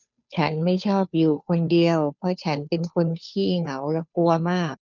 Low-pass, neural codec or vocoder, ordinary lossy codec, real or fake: 7.2 kHz; codec, 16 kHz, 2 kbps, FunCodec, trained on Chinese and English, 25 frames a second; none; fake